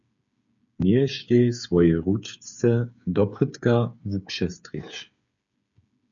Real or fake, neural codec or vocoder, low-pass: fake; codec, 16 kHz, 8 kbps, FreqCodec, smaller model; 7.2 kHz